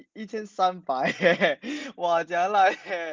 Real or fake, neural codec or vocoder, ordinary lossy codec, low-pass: real; none; Opus, 32 kbps; 7.2 kHz